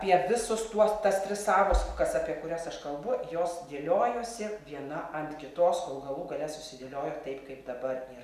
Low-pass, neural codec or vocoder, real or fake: 14.4 kHz; none; real